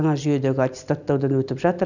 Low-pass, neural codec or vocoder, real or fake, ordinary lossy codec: 7.2 kHz; none; real; none